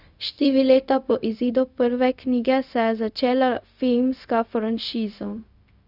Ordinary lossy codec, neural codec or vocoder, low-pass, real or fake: none; codec, 16 kHz, 0.4 kbps, LongCat-Audio-Codec; 5.4 kHz; fake